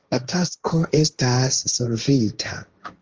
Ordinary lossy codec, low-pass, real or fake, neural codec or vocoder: Opus, 24 kbps; 7.2 kHz; fake; codec, 16 kHz, 1.1 kbps, Voila-Tokenizer